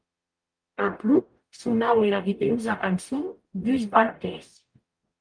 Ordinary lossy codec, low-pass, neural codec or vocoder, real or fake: Opus, 32 kbps; 9.9 kHz; codec, 44.1 kHz, 0.9 kbps, DAC; fake